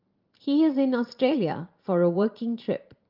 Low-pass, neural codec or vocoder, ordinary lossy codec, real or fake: 5.4 kHz; vocoder, 44.1 kHz, 128 mel bands every 512 samples, BigVGAN v2; Opus, 24 kbps; fake